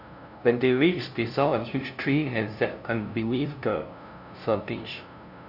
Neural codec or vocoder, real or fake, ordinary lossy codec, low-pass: codec, 16 kHz, 0.5 kbps, FunCodec, trained on LibriTTS, 25 frames a second; fake; AAC, 48 kbps; 5.4 kHz